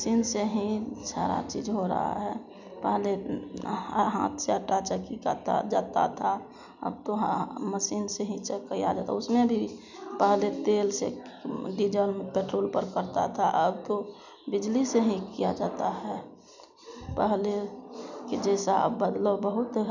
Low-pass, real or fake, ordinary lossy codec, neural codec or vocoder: 7.2 kHz; real; none; none